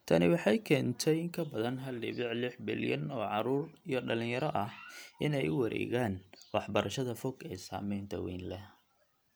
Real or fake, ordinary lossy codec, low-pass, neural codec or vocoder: real; none; none; none